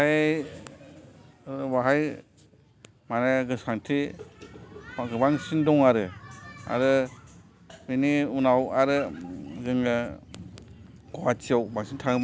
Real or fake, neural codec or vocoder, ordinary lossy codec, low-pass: real; none; none; none